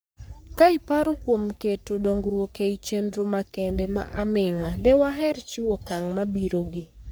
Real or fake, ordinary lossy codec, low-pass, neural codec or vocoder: fake; none; none; codec, 44.1 kHz, 3.4 kbps, Pupu-Codec